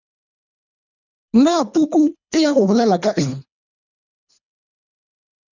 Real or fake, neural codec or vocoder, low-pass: fake; codec, 24 kHz, 3 kbps, HILCodec; 7.2 kHz